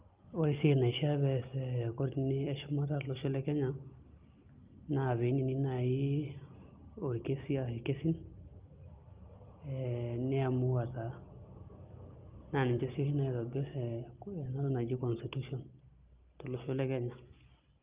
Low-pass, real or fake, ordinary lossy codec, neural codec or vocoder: 3.6 kHz; real; Opus, 24 kbps; none